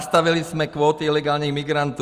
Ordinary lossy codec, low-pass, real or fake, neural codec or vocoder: Opus, 32 kbps; 14.4 kHz; real; none